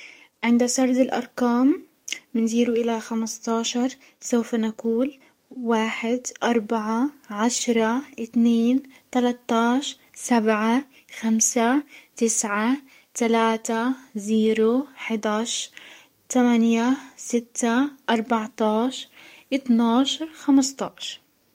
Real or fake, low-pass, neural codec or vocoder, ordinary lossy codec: fake; 19.8 kHz; codec, 44.1 kHz, 7.8 kbps, DAC; MP3, 64 kbps